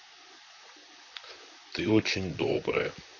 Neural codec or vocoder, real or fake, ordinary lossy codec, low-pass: vocoder, 44.1 kHz, 128 mel bands, Pupu-Vocoder; fake; none; 7.2 kHz